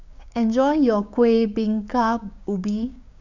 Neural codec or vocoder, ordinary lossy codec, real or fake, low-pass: codec, 16 kHz, 6 kbps, DAC; none; fake; 7.2 kHz